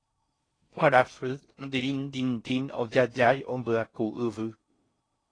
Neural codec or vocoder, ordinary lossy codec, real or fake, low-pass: codec, 16 kHz in and 24 kHz out, 0.6 kbps, FocalCodec, streaming, 4096 codes; AAC, 32 kbps; fake; 9.9 kHz